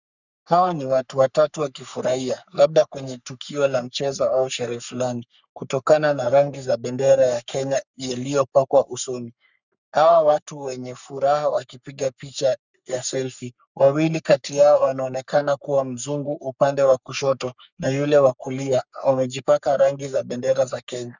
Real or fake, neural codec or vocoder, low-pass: fake; codec, 44.1 kHz, 3.4 kbps, Pupu-Codec; 7.2 kHz